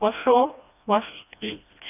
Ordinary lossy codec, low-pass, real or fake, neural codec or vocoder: none; 3.6 kHz; fake; codec, 16 kHz, 1 kbps, FreqCodec, smaller model